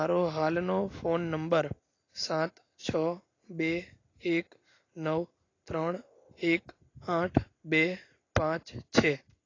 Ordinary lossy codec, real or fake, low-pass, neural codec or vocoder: AAC, 32 kbps; real; 7.2 kHz; none